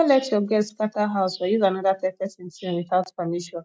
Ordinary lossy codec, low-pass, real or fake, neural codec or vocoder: none; none; real; none